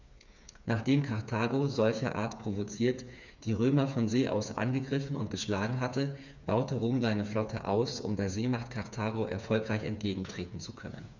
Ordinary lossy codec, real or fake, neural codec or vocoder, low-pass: none; fake; codec, 16 kHz, 4 kbps, FreqCodec, smaller model; 7.2 kHz